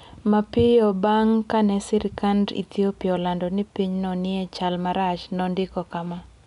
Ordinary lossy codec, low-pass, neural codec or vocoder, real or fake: none; 10.8 kHz; none; real